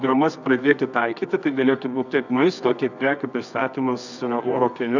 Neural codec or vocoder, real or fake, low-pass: codec, 24 kHz, 0.9 kbps, WavTokenizer, medium music audio release; fake; 7.2 kHz